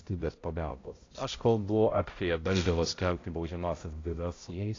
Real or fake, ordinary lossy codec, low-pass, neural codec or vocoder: fake; AAC, 32 kbps; 7.2 kHz; codec, 16 kHz, 0.5 kbps, X-Codec, HuBERT features, trained on balanced general audio